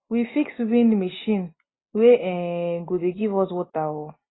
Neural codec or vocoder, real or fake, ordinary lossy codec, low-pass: none; real; AAC, 16 kbps; 7.2 kHz